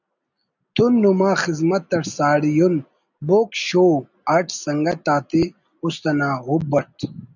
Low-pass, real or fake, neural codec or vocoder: 7.2 kHz; real; none